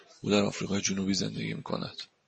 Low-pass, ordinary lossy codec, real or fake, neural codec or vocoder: 10.8 kHz; MP3, 32 kbps; real; none